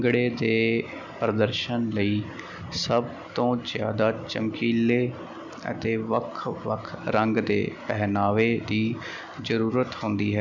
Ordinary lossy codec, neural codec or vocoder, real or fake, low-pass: none; none; real; 7.2 kHz